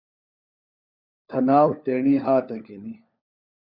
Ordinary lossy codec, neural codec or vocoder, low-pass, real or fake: Opus, 64 kbps; codec, 16 kHz, 4 kbps, FunCodec, trained on LibriTTS, 50 frames a second; 5.4 kHz; fake